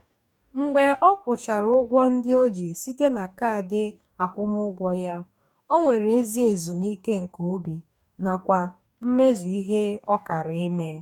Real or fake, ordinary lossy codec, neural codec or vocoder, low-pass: fake; none; codec, 44.1 kHz, 2.6 kbps, DAC; 19.8 kHz